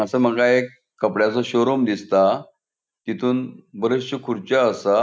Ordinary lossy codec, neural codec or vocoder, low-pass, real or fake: none; none; none; real